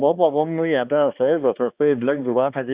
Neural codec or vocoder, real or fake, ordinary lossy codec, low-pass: codec, 16 kHz, 2 kbps, X-Codec, HuBERT features, trained on balanced general audio; fake; Opus, 64 kbps; 3.6 kHz